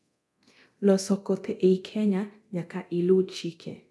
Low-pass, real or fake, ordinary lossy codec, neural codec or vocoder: none; fake; none; codec, 24 kHz, 0.9 kbps, DualCodec